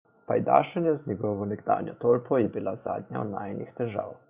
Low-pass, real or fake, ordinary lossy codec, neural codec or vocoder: 3.6 kHz; fake; Opus, 64 kbps; vocoder, 44.1 kHz, 128 mel bands every 512 samples, BigVGAN v2